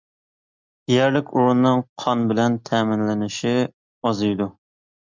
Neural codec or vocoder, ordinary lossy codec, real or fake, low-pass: none; MP3, 64 kbps; real; 7.2 kHz